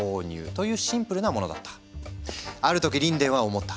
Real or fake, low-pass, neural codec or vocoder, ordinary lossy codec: real; none; none; none